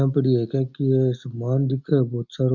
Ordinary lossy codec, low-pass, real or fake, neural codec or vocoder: MP3, 64 kbps; 7.2 kHz; real; none